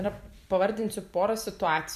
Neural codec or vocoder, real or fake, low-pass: vocoder, 44.1 kHz, 128 mel bands every 256 samples, BigVGAN v2; fake; 14.4 kHz